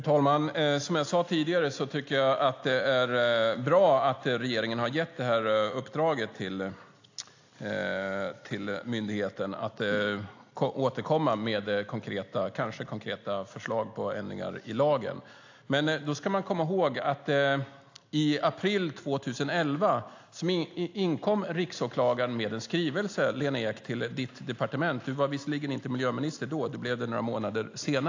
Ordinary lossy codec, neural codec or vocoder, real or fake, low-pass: AAC, 48 kbps; none; real; 7.2 kHz